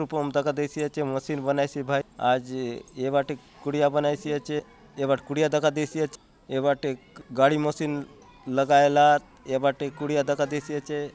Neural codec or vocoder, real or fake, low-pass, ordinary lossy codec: none; real; none; none